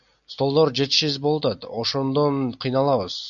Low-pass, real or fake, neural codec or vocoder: 7.2 kHz; real; none